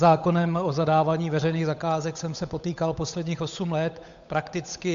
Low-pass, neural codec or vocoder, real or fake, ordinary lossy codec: 7.2 kHz; codec, 16 kHz, 8 kbps, FunCodec, trained on Chinese and English, 25 frames a second; fake; MP3, 64 kbps